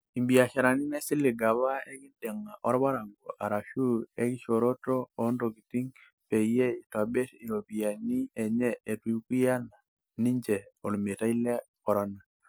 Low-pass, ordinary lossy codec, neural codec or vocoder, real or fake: none; none; none; real